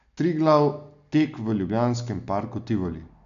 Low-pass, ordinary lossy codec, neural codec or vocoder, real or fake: 7.2 kHz; none; none; real